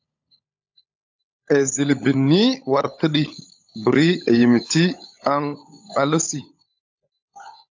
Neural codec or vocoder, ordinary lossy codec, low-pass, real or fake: codec, 16 kHz, 16 kbps, FunCodec, trained on LibriTTS, 50 frames a second; AAC, 48 kbps; 7.2 kHz; fake